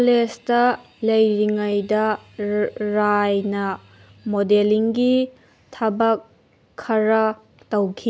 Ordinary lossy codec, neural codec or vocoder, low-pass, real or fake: none; none; none; real